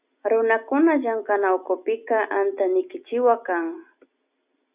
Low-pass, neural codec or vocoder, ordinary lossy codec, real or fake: 3.6 kHz; none; Opus, 64 kbps; real